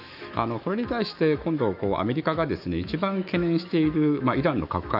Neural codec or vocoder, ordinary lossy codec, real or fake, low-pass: autoencoder, 48 kHz, 128 numbers a frame, DAC-VAE, trained on Japanese speech; none; fake; 5.4 kHz